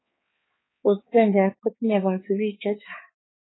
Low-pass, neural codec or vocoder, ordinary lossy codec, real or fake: 7.2 kHz; codec, 16 kHz, 4 kbps, X-Codec, HuBERT features, trained on balanced general audio; AAC, 16 kbps; fake